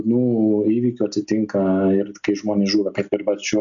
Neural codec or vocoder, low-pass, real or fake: none; 7.2 kHz; real